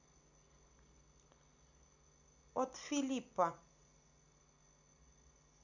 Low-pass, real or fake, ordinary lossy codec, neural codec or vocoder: 7.2 kHz; real; none; none